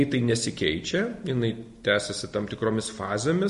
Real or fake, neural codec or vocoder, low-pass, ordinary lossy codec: real; none; 14.4 kHz; MP3, 48 kbps